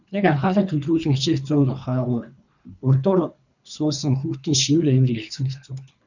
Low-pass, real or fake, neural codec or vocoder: 7.2 kHz; fake; codec, 24 kHz, 3 kbps, HILCodec